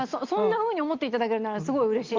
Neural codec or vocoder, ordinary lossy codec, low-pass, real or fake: none; Opus, 32 kbps; 7.2 kHz; real